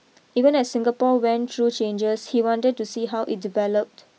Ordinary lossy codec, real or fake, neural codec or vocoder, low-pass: none; real; none; none